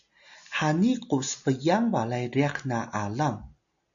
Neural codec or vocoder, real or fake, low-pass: none; real; 7.2 kHz